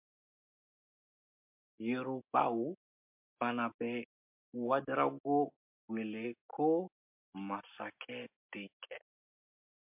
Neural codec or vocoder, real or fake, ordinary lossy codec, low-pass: none; real; MP3, 32 kbps; 3.6 kHz